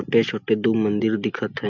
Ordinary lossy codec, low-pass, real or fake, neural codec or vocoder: none; 7.2 kHz; real; none